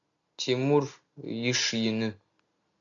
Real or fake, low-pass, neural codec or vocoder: real; 7.2 kHz; none